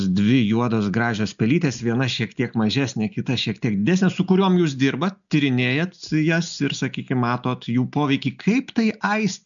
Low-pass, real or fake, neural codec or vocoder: 7.2 kHz; real; none